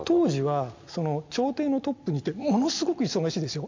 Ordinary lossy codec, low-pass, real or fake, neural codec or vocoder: MP3, 48 kbps; 7.2 kHz; real; none